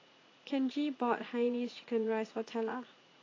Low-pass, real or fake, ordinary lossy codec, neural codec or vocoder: 7.2 kHz; fake; AAC, 32 kbps; vocoder, 44.1 kHz, 80 mel bands, Vocos